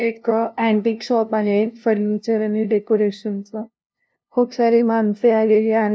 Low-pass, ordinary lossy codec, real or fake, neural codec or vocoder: none; none; fake; codec, 16 kHz, 0.5 kbps, FunCodec, trained on LibriTTS, 25 frames a second